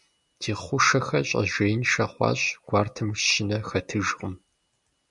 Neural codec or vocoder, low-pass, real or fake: none; 10.8 kHz; real